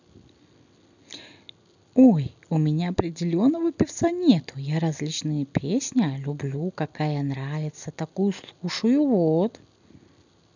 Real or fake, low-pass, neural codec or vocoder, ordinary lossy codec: real; 7.2 kHz; none; none